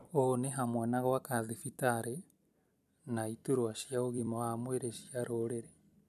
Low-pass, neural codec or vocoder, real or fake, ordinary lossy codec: 14.4 kHz; vocoder, 44.1 kHz, 128 mel bands every 256 samples, BigVGAN v2; fake; none